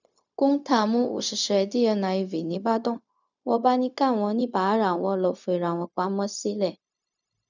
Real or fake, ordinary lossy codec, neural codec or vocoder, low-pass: fake; none; codec, 16 kHz, 0.4 kbps, LongCat-Audio-Codec; 7.2 kHz